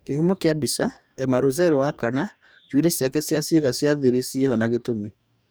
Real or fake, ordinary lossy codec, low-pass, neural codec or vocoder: fake; none; none; codec, 44.1 kHz, 2.6 kbps, DAC